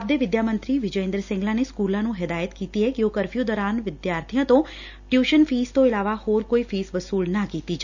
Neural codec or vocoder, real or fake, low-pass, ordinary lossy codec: none; real; 7.2 kHz; none